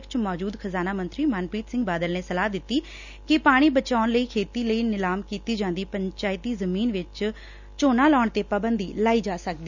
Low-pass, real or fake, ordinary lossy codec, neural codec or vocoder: 7.2 kHz; real; none; none